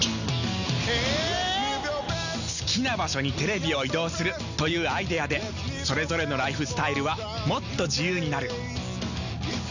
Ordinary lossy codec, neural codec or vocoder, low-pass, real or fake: none; none; 7.2 kHz; real